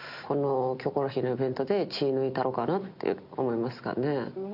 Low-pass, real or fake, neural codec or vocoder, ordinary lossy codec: 5.4 kHz; real; none; none